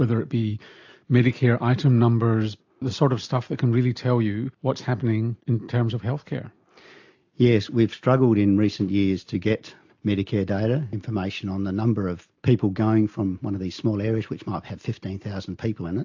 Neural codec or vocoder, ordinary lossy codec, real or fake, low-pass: none; AAC, 48 kbps; real; 7.2 kHz